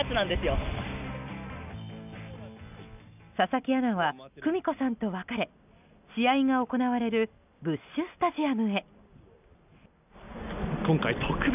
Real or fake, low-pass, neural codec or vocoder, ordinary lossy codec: real; 3.6 kHz; none; none